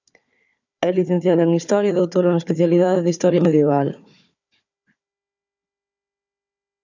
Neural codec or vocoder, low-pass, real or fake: codec, 16 kHz, 4 kbps, FunCodec, trained on Chinese and English, 50 frames a second; 7.2 kHz; fake